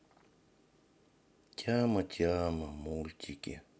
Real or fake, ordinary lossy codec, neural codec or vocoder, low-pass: real; none; none; none